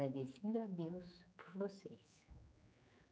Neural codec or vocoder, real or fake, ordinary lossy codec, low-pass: codec, 16 kHz, 4 kbps, X-Codec, HuBERT features, trained on general audio; fake; none; none